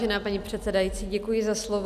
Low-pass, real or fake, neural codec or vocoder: 14.4 kHz; real; none